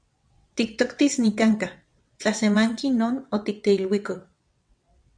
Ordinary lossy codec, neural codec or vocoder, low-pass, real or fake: MP3, 64 kbps; vocoder, 22.05 kHz, 80 mel bands, WaveNeXt; 9.9 kHz; fake